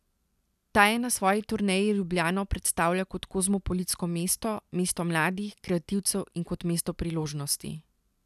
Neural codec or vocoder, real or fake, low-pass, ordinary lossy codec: none; real; 14.4 kHz; none